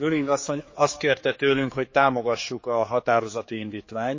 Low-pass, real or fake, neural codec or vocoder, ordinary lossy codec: 7.2 kHz; fake; codec, 16 kHz, 4 kbps, X-Codec, HuBERT features, trained on general audio; MP3, 32 kbps